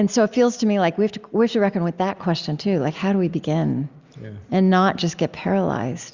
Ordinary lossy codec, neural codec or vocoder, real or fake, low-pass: Opus, 64 kbps; none; real; 7.2 kHz